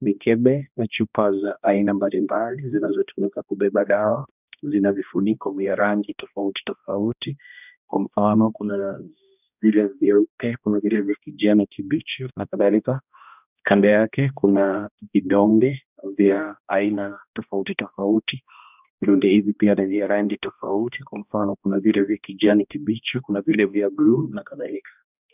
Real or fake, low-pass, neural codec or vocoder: fake; 3.6 kHz; codec, 16 kHz, 1 kbps, X-Codec, HuBERT features, trained on balanced general audio